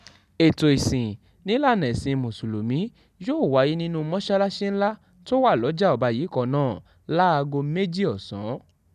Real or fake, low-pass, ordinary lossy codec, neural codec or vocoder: real; 14.4 kHz; none; none